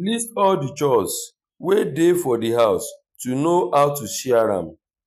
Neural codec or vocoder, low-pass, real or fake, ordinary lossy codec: none; 14.4 kHz; real; none